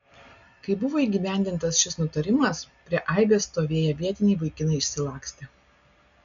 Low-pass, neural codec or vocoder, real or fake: 7.2 kHz; none; real